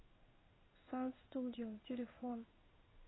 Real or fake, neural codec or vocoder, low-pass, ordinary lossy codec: fake; codec, 16 kHz in and 24 kHz out, 1 kbps, XY-Tokenizer; 7.2 kHz; AAC, 16 kbps